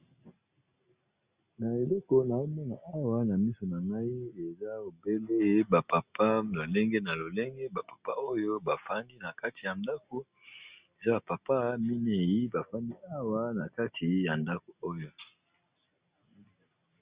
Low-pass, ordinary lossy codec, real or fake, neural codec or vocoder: 3.6 kHz; Opus, 64 kbps; real; none